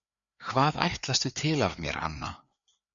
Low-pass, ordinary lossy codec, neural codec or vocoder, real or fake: 7.2 kHz; MP3, 96 kbps; codec, 16 kHz, 4 kbps, FreqCodec, larger model; fake